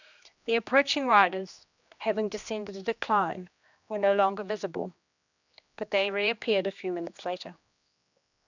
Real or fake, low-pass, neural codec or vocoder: fake; 7.2 kHz; codec, 16 kHz, 2 kbps, X-Codec, HuBERT features, trained on general audio